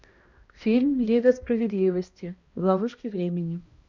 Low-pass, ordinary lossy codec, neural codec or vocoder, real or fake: 7.2 kHz; Opus, 64 kbps; codec, 16 kHz, 1 kbps, X-Codec, HuBERT features, trained on balanced general audio; fake